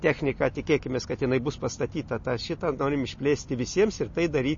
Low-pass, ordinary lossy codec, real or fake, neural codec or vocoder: 7.2 kHz; MP3, 32 kbps; real; none